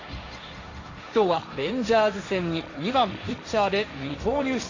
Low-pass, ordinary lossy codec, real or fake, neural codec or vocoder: 7.2 kHz; AAC, 48 kbps; fake; codec, 16 kHz, 1.1 kbps, Voila-Tokenizer